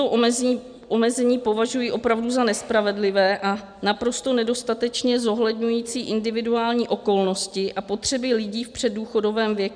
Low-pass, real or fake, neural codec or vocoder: 9.9 kHz; real; none